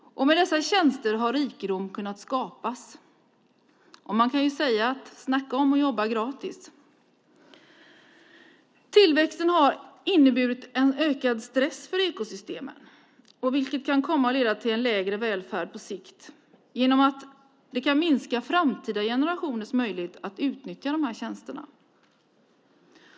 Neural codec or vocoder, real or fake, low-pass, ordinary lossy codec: none; real; none; none